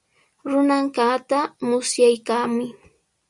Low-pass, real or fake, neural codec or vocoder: 10.8 kHz; real; none